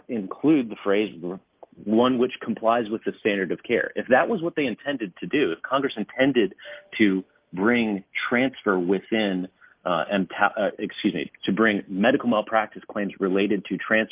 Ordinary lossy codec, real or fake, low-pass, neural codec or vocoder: Opus, 24 kbps; real; 3.6 kHz; none